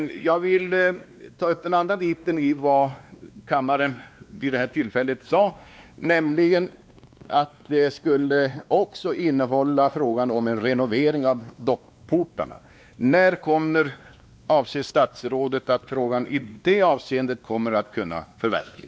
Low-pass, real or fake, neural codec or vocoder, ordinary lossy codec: none; fake; codec, 16 kHz, 2 kbps, X-Codec, WavLM features, trained on Multilingual LibriSpeech; none